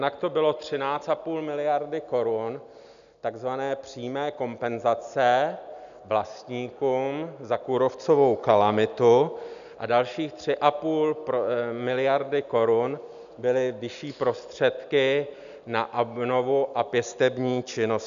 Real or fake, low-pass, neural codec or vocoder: real; 7.2 kHz; none